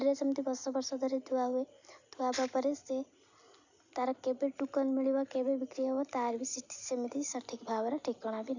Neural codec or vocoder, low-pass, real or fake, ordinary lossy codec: none; 7.2 kHz; real; none